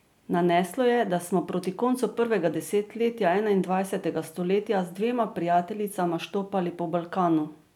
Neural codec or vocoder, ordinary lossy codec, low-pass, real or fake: none; none; 19.8 kHz; real